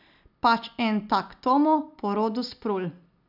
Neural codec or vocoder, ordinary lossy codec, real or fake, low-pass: none; none; real; 5.4 kHz